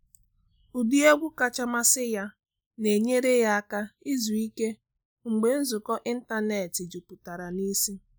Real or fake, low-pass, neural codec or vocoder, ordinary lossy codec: real; none; none; none